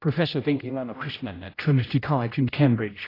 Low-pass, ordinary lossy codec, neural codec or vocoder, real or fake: 5.4 kHz; AAC, 24 kbps; codec, 16 kHz, 0.5 kbps, X-Codec, HuBERT features, trained on general audio; fake